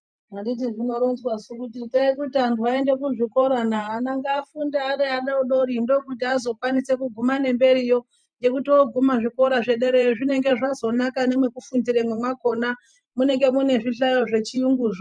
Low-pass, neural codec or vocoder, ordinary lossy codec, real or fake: 9.9 kHz; vocoder, 44.1 kHz, 128 mel bands every 512 samples, BigVGAN v2; MP3, 96 kbps; fake